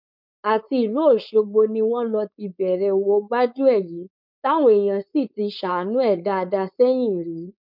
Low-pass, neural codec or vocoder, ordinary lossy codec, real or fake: 5.4 kHz; codec, 16 kHz, 4.8 kbps, FACodec; none; fake